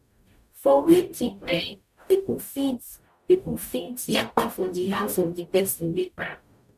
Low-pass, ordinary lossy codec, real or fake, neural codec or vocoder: 14.4 kHz; none; fake; codec, 44.1 kHz, 0.9 kbps, DAC